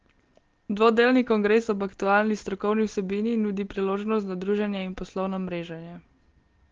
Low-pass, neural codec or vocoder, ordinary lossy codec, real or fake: 7.2 kHz; none; Opus, 16 kbps; real